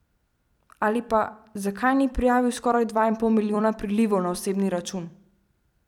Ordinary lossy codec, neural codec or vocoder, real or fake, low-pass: none; vocoder, 44.1 kHz, 128 mel bands every 256 samples, BigVGAN v2; fake; 19.8 kHz